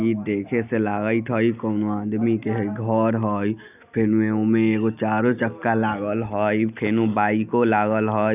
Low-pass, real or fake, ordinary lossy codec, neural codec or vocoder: 3.6 kHz; real; none; none